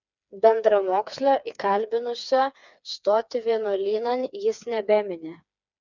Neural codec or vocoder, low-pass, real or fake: codec, 16 kHz, 4 kbps, FreqCodec, smaller model; 7.2 kHz; fake